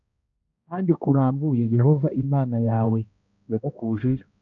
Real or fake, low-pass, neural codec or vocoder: fake; 7.2 kHz; codec, 16 kHz, 1 kbps, X-Codec, HuBERT features, trained on balanced general audio